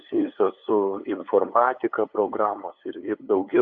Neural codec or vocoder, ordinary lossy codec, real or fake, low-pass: codec, 16 kHz, 8 kbps, FunCodec, trained on LibriTTS, 25 frames a second; AAC, 48 kbps; fake; 7.2 kHz